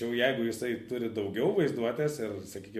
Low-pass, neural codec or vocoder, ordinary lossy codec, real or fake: 14.4 kHz; none; MP3, 64 kbps; real